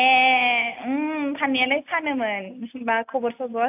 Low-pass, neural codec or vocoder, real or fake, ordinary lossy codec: 3.6 kHz; none; real; AAC, 32 kbps